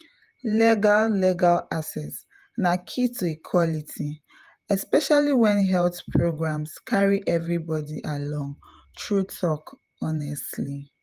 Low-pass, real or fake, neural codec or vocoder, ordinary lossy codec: 14.4 kHz; fake; vocoder, 48 kHz, 128 mel bands, Vocos; Opus, 32 kbps